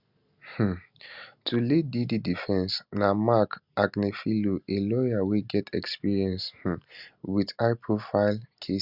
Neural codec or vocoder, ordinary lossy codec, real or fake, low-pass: none; Opus, 64 kbps; real; 5.4 kHz